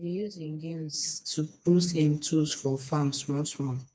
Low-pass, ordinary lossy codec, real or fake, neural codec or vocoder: none; none; fake; codec, 16 kHz, 2 kbps, FreqCodec, smaller model